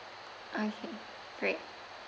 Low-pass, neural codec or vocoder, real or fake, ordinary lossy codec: none; none; real; none